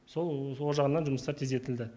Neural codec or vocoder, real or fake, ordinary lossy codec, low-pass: none; real; none; none